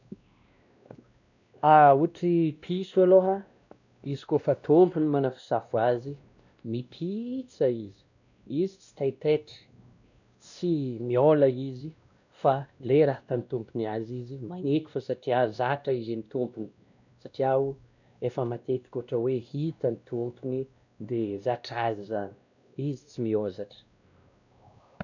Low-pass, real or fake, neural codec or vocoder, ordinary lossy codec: 7.2 kHz; fake; codec, 16 kHz, 1 kbps, X-Codec, WavLM features, trained on Multilingual LibriSpeech; none